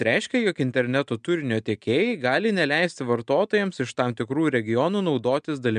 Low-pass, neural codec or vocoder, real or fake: 9.9 kHz; none; real